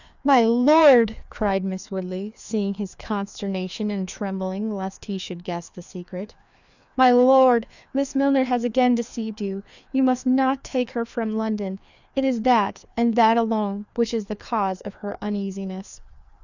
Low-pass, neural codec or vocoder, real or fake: 7.2 kHz; codec, 16 kHz, 2 kbps, FreqCodec, larger model; fake